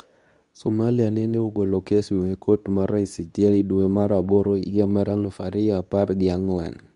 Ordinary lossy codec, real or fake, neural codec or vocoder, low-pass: none; fake; codec, 24 kHz, 0.9 kbps, WavTokenizer, medium speech release version 2; 10.8 kHz